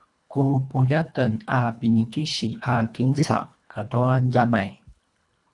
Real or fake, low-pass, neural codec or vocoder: fake; 10.8 kHz; codec, 24 kHz, 1.5 kbps, HILCodec